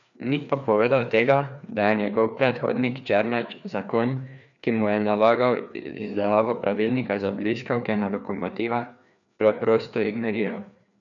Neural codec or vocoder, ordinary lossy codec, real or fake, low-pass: codec, 16 kHz, 2 kbps, FreqCodec, larger model; none; fake; 7.2 kHz